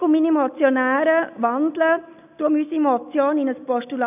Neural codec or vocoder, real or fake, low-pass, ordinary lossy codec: none; real; 3.6 kHz; none